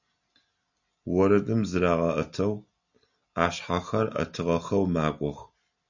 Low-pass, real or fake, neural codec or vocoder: 7.2 kHz; real; none